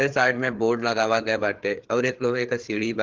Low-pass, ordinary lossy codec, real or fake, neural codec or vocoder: 7.2 kHz; Opus, 16 kbps; fake; codec, 16 kHz, 4 kbps, FreqCodec, larger model